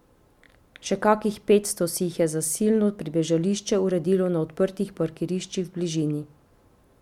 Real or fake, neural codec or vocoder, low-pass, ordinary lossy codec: real; none; 19.8 kHz; MP3, 96 kbps